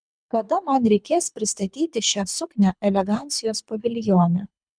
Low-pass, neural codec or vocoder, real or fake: 9.9 kHz; codec, 24 kHz, 3 kbps, HILCodec; fake